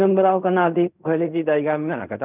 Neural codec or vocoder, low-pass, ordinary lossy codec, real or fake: codec, 16 kHz in and 24 kHz out, 0.4 kbps, LongCat-Audio-Codec, fine tuned four codebook decoder; 3.6 kHz; none; fake